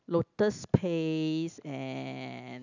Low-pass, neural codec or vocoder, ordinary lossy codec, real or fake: 7.2 kHz; none; none; real